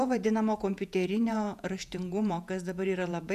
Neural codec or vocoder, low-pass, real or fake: vocoder, 48 kHz, 128 mel bands, Vocos; 14.4 kHz; fake